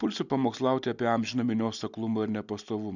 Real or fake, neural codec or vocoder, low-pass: real; none; 7.2 kHz